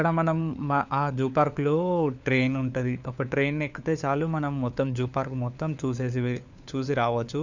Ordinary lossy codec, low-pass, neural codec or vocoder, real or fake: none; 7.2 kHz; codec, 16 kHz, 4 kbps, FunCodec, trained on LibriTTS, 50 frames a second; fake